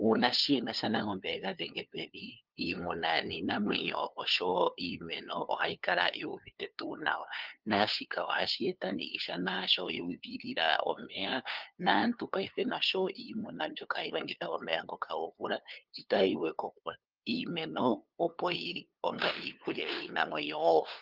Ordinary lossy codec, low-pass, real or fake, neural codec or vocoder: Opus, 24 kbps; 5.4 kHz; fake; codec, 16 kHz, 2 kbps, FunCodec, trained on LibriTTS, 25 frames a second